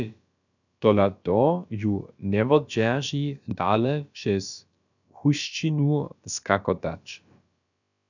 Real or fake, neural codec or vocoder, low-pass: fake; codec, 16 kHz, about 1 kbps, DyCAST, with the encoder's durations; 7.2 kHz